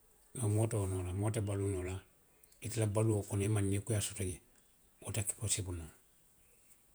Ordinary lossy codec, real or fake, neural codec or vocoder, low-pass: none; fake; vocoder, 48 kHz, 128 mel bands, Vocos; none